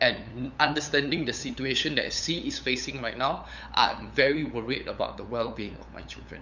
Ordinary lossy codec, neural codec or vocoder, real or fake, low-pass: none; codec, 16 kHz, 8 kbps, FunCodec, trained on LibriTTS, 25 frames a second; fake; 7.2 kHz